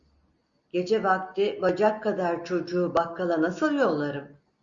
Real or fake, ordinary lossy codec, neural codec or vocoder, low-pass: real; Opus, 64 kbps; none; 7.2 kHz